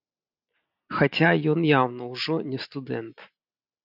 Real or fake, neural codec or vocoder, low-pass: real; none; 5.4 kHz